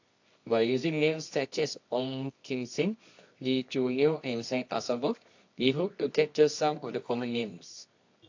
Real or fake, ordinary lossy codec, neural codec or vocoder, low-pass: fake; AAC, 48 kbps; codec, 24 kHz, 0.9 kbps, WavTokenizer, medium music audio release; 7.2 kHz